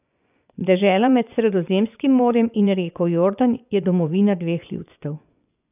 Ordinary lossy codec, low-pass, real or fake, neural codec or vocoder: none; 3.6 kHz; fake; vocoder, 24 kHz, 100 mel bands, Vocos